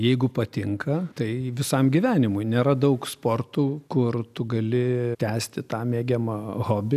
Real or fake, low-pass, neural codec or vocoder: real; 14.4 kHz; none